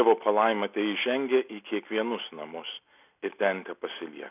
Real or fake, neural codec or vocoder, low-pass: real; none; 3.6 kHz